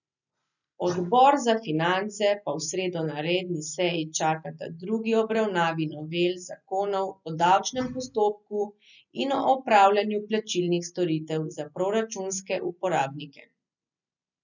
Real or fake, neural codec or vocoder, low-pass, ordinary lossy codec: real; none; 7.2 kHz; none